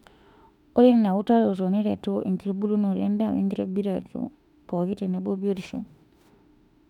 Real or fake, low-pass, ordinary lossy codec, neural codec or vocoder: fake; 19.8 kHz; none; autoencoder, 48 kHz, 32 numbers a frame, DAC-VAE, trained on Japanese speech